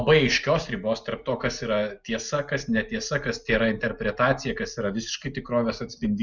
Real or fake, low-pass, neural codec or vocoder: real; 7.2 kHz; none